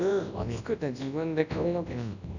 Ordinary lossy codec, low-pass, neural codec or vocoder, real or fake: none; 7.2 kHz; codec, 24 kHz, 0.9 kbps, WavTokenizer, large speech release; fake